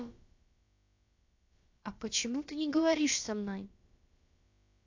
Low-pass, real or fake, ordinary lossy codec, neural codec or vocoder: 7.2 kHz; fake; AAC, 48 kbps; codec, 16 kHz, about 1 kbps, DyCAST, with the encoder's durations